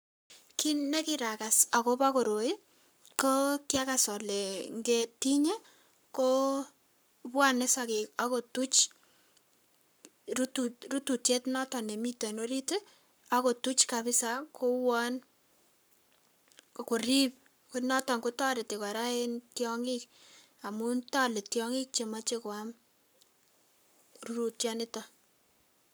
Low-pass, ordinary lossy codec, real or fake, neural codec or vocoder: none; none; fake; codec, 44.1 kHz, 7.8 kbps, Pupu-Codec